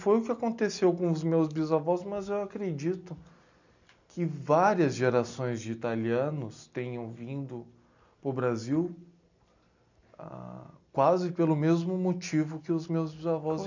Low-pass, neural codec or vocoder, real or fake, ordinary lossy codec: 7.2 kHz; none; real; none